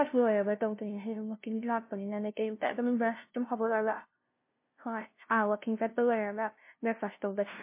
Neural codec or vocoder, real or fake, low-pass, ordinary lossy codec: codec, 16 kHz, 0.5 kbps, FunCodec, trained on LibriTTS, 25 frames a second; fake; 3.6 kHz; MP3, 24 kbps